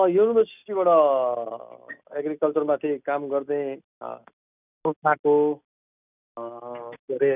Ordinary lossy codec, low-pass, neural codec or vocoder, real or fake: none; 3.6 kHz; none; real